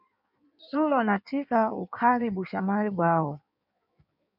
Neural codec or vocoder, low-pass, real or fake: codec, 16 kHz in and 24 kHz out, 1.1 kbps, FireRedTTS-2 codec; 5.4 kHz; fake